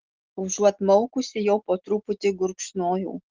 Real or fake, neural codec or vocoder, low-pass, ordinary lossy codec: real; none; 7.2 kHz; Opus, 16 kbps